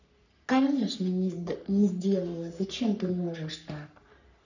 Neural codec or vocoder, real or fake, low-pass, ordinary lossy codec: codec, 44.1 kHz, 3.4 kbps, Pupu-Codec; fake; 7.2 kHz; none